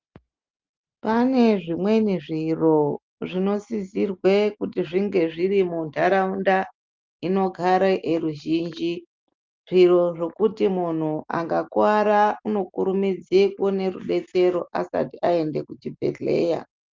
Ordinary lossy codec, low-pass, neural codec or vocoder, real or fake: Opus, 24 kbps; 7.2 kHz; none; real